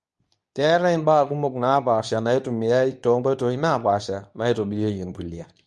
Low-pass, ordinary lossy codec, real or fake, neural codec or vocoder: none; none; fake; codec, 24 kHz, 0.9 kbps, WavTokenizer, medium speech release version 2